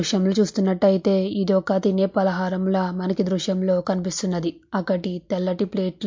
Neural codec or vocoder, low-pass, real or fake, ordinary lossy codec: none; 7.2 kHz; real; MP3, 48 kbps